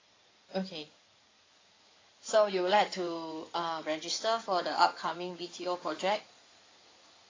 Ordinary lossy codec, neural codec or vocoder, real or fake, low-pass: AAC, 32 kbps; codec, 16 kHz in and 24 kHz out, 2.2 kbps, FireRedTTS-2 codec; fake; 7.2 kHz